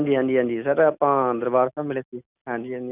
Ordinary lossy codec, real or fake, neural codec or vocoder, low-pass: none; real; none; 3.6 kHz